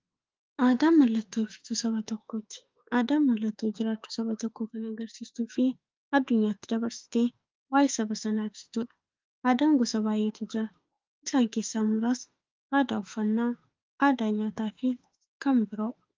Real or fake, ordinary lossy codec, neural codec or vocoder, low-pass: fake; Opus, 24 kbps; autoencoder, 48 kHz, 32 numbers a frame, DAC-VAE, trained on Japanese speech; 7.2 kHz